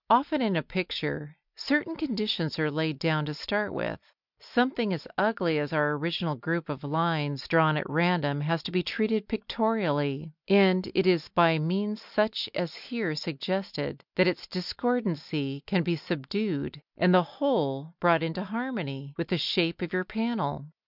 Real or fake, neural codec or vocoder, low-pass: real; none; 5.4 kHz